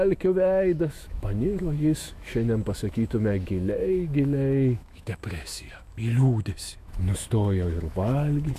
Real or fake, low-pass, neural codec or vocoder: fake; 14.4 kHz; autoencoder, 48 kHz, 128 numbers a frame, DAC-VAE, trained on Japanese speech